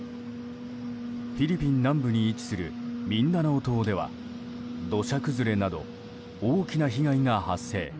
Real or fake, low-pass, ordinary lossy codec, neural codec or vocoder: real; none; none; none